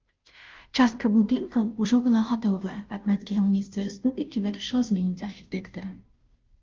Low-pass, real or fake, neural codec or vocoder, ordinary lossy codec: 7.2 kHz; fake; codec, 16 kHz, 0.5 kbps, FunCodec, trained on Chinese and English, 25 frames a second; Opus, 24 kbps